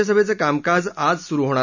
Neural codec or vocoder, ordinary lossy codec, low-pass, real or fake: none; none; 7.2 kHz; real